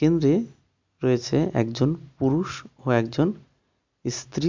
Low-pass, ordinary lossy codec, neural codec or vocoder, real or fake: 7.2 kHz; MP3, 64 kbps; none; real